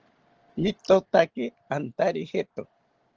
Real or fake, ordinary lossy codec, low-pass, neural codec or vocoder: real; Opus, 16 kbps; 7.2 kHz; none